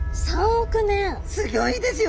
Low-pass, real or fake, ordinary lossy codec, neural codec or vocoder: none; real; none; none